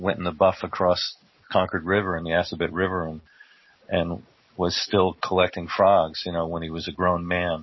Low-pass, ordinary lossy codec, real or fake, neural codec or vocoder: 7.2 kHz; MP3, 24 kbps; real; none